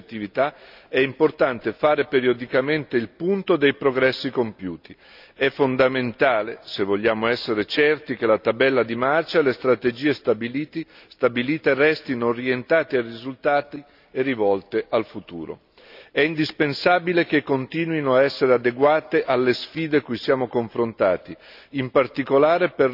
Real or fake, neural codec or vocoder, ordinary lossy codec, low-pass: real; none; none; 5.4 kHz